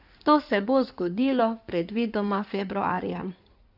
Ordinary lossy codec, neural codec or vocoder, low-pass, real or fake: none; codec, 16 kHz in and 24 kHz out, 2.2 kbps, FireRedTTS-2 codec; 5.4 kHz; fake